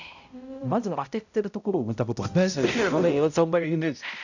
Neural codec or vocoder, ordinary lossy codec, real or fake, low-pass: codec, 16 kHz, 0.5 kbps, X-Codec, HuBERT features, trained on balanced general audio; none; fake; 7.2 kHz